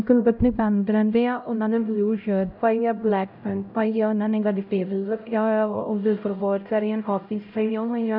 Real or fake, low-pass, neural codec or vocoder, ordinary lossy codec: fake; 5.4 kHz; codec, 16 kHz, 0.5 kbps, X-Codec, HuBERT features, trained on LibriSpeech; none